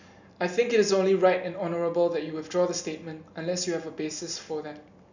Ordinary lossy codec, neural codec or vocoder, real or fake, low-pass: none; none; real; 7.2 kHz